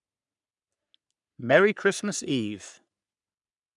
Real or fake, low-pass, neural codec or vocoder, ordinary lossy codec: fake; 10.8 kHz; codec, 44.1 kHz, 3.4 kbps, Pupu-Codec; none